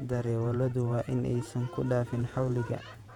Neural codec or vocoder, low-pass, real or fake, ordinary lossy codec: vocoder, 48 kHz, 128 mel bands, Vocos; 19.8 kHz; fake; none